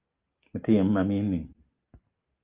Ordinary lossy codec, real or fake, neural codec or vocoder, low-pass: Opus, 32 kbps; real; none; 3.6 kHz